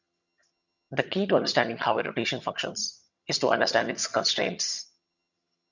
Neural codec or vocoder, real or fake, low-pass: vocoder, 22.05 kHz, 80 mel bands, HiFi-GAN; fake; 7.2 kHz